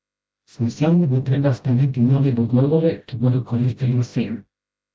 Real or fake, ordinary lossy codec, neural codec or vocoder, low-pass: fake; none; codec, 16 kHz, 0.5 kbps, FreqCodec, smaller model; none